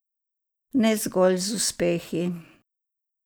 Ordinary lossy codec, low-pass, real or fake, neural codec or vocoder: none; none; real; none